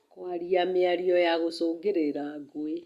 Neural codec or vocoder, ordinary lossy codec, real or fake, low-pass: none; Opus, 64 kbps; real; 14.4 kHz